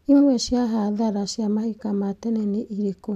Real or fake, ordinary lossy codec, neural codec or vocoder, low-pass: real; none; none; 14.4 kHz